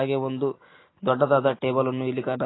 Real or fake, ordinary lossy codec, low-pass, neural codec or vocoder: real; AAC, 16 kbps; 7.2 kHz; none